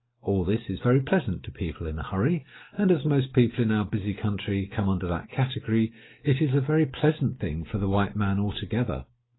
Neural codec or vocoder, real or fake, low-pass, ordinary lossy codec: none; real; 7.2 kHz; AAC, 16 kbps